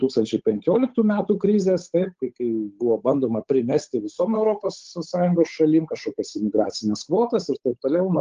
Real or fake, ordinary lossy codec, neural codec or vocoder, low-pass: fake; Opus, 32 kbps; codec, 16 kHz, 8 kbps, FunCodec, trained on Chinese and English, 25 frames a second; 7.2 kHz